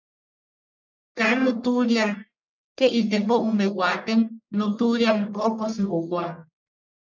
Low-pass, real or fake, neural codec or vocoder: 7.2 kHz; fake; codec, 44.1 kHz, 1.7 kbps, Pupu-Codec